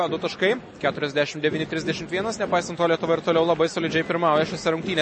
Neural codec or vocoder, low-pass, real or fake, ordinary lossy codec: none; 10.8 kHz; real; MP3, 32 kbps